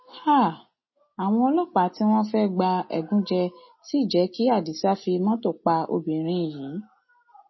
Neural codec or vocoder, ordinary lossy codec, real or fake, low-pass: none; MP3, 24 kbps; real; 7.2 kHz